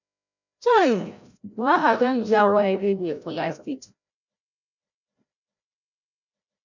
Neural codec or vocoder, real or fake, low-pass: codec, 16 kHz, 0.5 kbps, FreqCodec, larger model; fake; 7.2 kHz